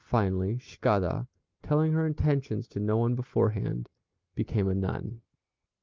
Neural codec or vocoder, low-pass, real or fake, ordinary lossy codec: none; 7.2 kHz; real; Opus, 32 kbps